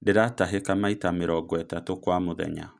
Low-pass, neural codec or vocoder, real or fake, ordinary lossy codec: 9.9 kHz; none; real; none